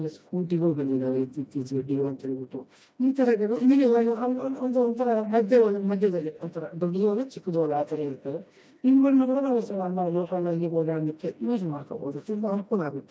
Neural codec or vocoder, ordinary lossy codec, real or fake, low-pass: codec, 16 kHz, 1 kbps, FreqCodec, smaller model; none; fake; none